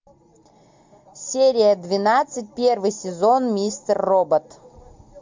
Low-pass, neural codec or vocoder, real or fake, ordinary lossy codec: 7.2 kHz; none; real; MP3, 64 kbps